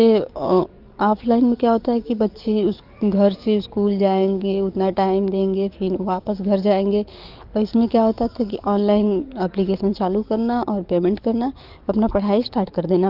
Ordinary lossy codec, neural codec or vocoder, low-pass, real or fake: Opus, 24 kbps; codec, 44.1 kHz, 7.8 kbps, DAC; 5.4 kHz; fake